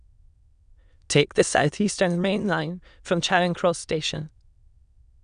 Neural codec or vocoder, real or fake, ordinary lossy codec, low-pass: autoencoder, 22.05 kHz, a latent of 192 numbers a frame, VITS, trained on many speakers; fake; none; 9.9 kHz